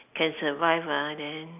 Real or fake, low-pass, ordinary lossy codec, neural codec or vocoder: real; 3.6 kHz; none; none